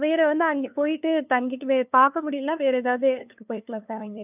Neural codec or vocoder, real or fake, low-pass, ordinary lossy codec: codec, 16 kHz, 2 kbps, FunCodec, trained on LibriTTS, 25 frames a second; fake; 3.6 kHz; none